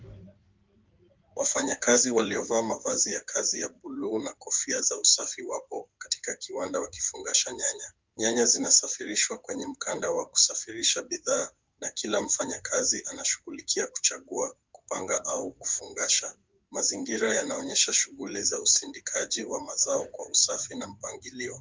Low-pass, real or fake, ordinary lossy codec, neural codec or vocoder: 7.2 kHz; fake; Opus, 32 kbps; codec, 16 kHz in and 24 kHz out, 2.2 kbps, FireRedTTS-2 codec